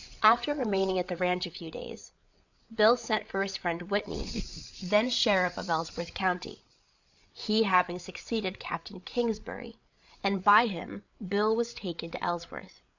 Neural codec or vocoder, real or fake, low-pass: codec, 16 kHz, 8 kbps, FreqCodec, larger model; fake; 7.2 kHz